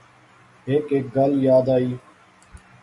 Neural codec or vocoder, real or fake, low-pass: none; real; 10.8 kHz